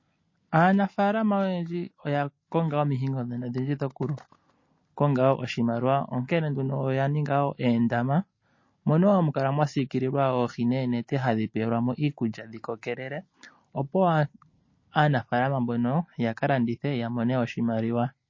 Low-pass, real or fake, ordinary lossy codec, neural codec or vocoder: 7.2 kHz; real; MP3, 32 kbps; none